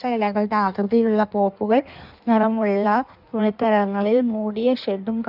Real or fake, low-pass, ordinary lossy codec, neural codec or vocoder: fake; 5.4 kHz; none; codec, 16 kHz in and 24 kHz out, 1.1 kbps, FireRedTTS-2 codec